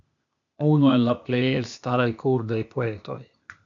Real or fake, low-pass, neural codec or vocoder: fake; 7.2 kHz; codec, 16 kHz, 0.8 kbps, ZipCodec